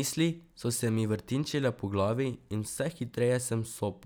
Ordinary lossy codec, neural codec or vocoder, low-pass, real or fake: none; none; none; real